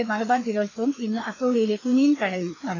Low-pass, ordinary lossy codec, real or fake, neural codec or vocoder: 7.2 kHz; AAC, 32 kbps; fake; codec, 16 kHz, 2 kbps, FreqCodec, larger model